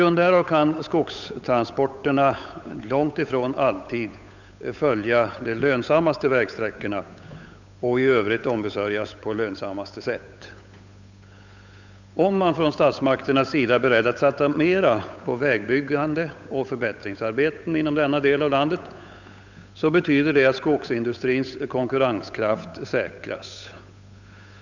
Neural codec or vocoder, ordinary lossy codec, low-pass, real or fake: codec, 16 kHz, 8 kbps, FunCodec, trained on Chinese and English, 25 frames a second; none; 7.2 kHz; fake